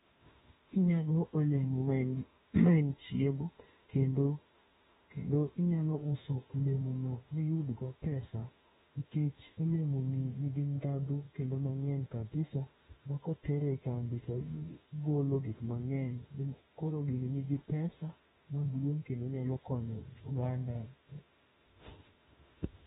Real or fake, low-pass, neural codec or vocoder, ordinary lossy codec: fake; 19.8 kHz; autoencoder, 48 kHz, 32 numbers a frame, DAC-VAE, trained on Japanese speech; AAC, 16 kbps